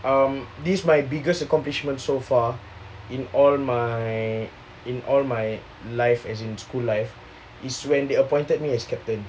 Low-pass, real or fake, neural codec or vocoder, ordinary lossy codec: none; real; none; none